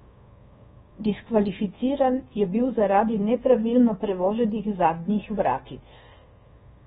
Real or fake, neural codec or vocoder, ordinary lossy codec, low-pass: fake; codec, 24 kHz, 1.2 kbps, DualCodec; AAC, 16 kbps; 10.8 kHz